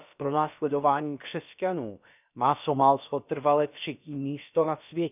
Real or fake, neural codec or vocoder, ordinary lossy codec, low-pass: fake; codec, 16 kHz, about 1 kbps, DyCAST, with the encoder's durations; none; 3.6 kHz